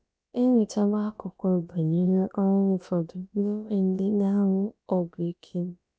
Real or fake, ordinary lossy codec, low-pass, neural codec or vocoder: fake; none; none; codec, 16 kHz, about 1 kbps, DyCAST, with the encoder's durations